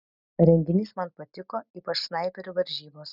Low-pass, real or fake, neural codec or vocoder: 5.4 kHz; real; none